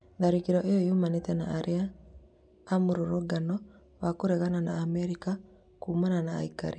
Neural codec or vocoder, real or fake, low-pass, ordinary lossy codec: none; real; 9.9 kHz; none